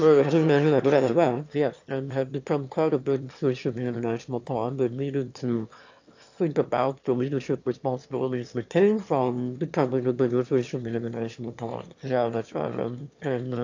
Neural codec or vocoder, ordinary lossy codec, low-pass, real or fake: autoencoder, 22.05 kHz, a latent of 192 numbers a frame, VITS, trained on one speaker; AAC, 48 kbps; 7.2 kHz; fake